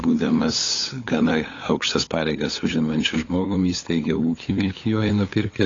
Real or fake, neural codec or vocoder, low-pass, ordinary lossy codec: fake; codec, 16 kHz, 8 kbps, FunCodec, trained on LibriTTS, 25 frames a second; 7.2 kHz; AAC, 32 kbps